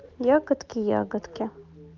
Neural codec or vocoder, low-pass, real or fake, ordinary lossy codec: none; 7.2 kHz; real; Opus, 24 kbps